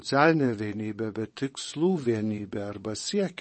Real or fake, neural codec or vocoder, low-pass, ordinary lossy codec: fake; vocoder, 22.05 kHz, 80 mel bands, WaveNeXt; 9.9 kHz; MP3, 32 kbps